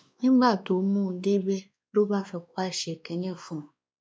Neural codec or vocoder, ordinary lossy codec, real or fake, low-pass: codec, 16 kHz, 2 kbps, X-Codec, WavLM features, trained on Multilingual LibriSpeech; none; fake; none